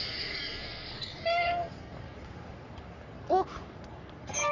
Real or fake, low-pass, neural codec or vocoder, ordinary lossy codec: fake; 7.2 kHz; codec, 44.1 kHz, 3.4 kbps, Pupu-Codec; none